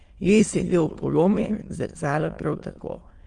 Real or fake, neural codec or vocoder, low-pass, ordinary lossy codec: fake; autoencoder, 22.05 kHz, a latent of 192 numbers a frame, VITS, trained on many speakers; 9.9 kHz; Opus, 24 kbps